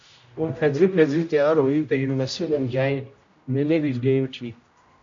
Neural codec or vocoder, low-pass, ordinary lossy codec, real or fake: codec, 16 kHz, 0.5 kbps, X-Codec, HuBERT features, trained on general audio; 7.2 kHz; MP3, 48 kbps; fake